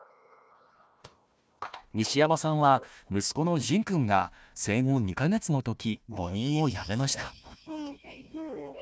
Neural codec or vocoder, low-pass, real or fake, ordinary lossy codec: codec, 16 kHz, 1 kbps, FunCodec, trained on Chinese and English, 50 frames a second; none; fake; none